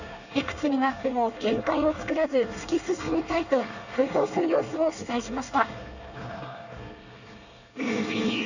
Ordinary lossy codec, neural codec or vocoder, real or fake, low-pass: none; codec, 24 kHz, 1 kbps, SNAC; fake; 7.2 kHz